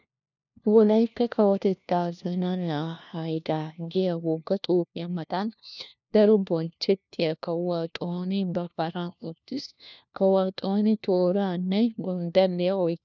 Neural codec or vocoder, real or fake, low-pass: codec, 16 kHz, 1 kbps, FunCodec, trained on LibriTTS, 50 frames a second; fake; 7.2 kHz